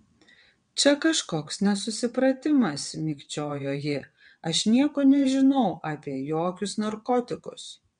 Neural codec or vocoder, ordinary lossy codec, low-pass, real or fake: vocoder, 22.05 kHz, 80 mel bands, WaveNeXt; MP3, 64 kbps; 9.9 kHz; fake